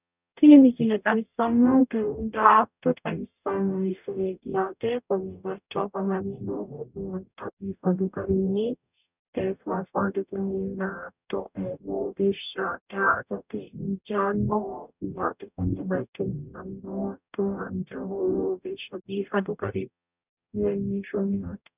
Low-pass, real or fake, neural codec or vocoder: 3.6 kHz; fake; codec, 44.1 kHz, 0.9 kbps, DAC